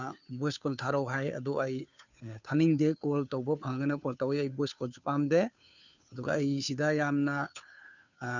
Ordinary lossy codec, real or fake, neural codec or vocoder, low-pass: none; fake; codec, 16 kHz, 2 kbps, FunCodec, trained on Chinese and English, 25 frames a second; 7.2 kHz